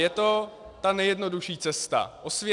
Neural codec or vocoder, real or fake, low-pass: none; real; 10.8 kHz